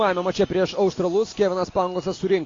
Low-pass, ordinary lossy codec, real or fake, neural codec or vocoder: 7.2 kHz; AAC, 32 kbps; real; none